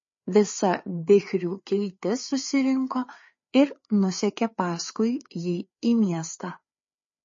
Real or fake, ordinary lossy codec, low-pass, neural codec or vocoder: fake; MP3, 32 kbps; 7.2 kHz; codec, 16 kHz, 4 kbps, FreqCodec, larger model